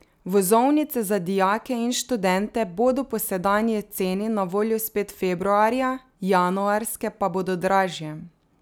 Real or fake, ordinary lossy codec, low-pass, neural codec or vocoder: real; none; none; none